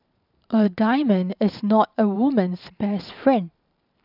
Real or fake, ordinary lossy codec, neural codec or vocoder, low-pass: fake; none; vocoder, 44.1 kHz, 128 mel bands every 512 samples, BigVGAN v2; 5.4 kHz